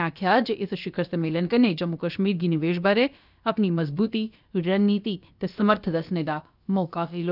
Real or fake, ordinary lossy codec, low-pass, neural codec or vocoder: fake; none; 5.4 kHz; codec, 16 kHz, about 1 kbps, DyCAST, with the encoder's durations